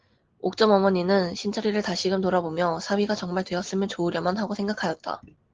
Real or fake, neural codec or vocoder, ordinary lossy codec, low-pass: real; none; Opus, 16 kbps; 7.2 kHz